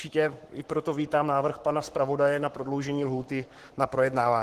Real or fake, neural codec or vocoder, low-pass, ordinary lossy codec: fake; codec, 44.1 kHz, 7.8 kbps, Pupu-Codec; 14.4 kHz; Opus, 16 kbps